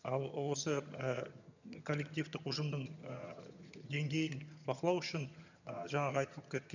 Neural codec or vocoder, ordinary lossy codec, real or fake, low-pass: vocoder, 22.05 kHz, 80 mel bands, HiFi-GAN; none; fake; 7.2 kHz